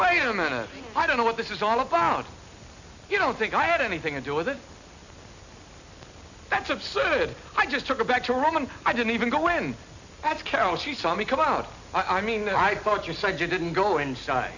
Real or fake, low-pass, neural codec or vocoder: real; 7.2 kHz; none